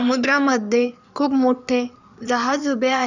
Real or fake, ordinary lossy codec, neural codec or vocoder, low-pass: fake; none; codec, 16 kHz in and 24 kHz out, 2.2 kbps, FireRedTTS-2 codec; 7.2 kHz